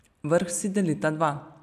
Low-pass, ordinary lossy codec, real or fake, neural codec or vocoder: 14.4 kHz; none; real; none